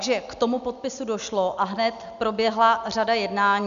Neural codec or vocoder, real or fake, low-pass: none; real; 7.2 kHz